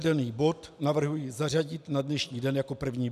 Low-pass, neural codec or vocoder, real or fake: 14.4 kHz; none; real